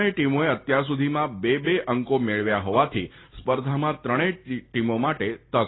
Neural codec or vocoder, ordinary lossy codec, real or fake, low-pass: none; AAC, 16 kbps; real; 7.2 kHz